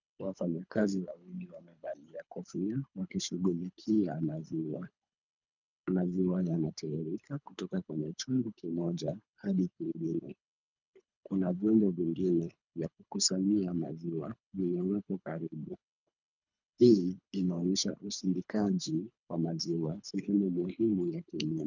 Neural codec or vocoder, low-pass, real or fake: codec, 24 kHz, 3 kbps, HILCodec; 7.2 kHz; fake